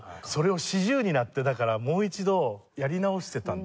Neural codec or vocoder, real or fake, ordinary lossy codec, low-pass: none; real; none; none